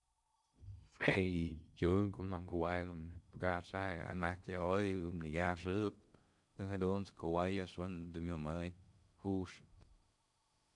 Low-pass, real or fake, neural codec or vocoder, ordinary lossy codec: 10.8 kHz; fake; codec, 16 kHz in and 24 kHz out, 0.6 kbps, FocalCodec, streaming, 4096 codes; none